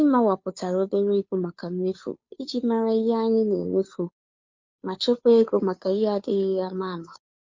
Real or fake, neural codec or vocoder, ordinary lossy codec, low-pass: fake; codec, 16 kHz, 2 kbps, FunCodec, trained on Chinese and English, 25 frames a second; MP3, 48 kbps; 7.2 kHz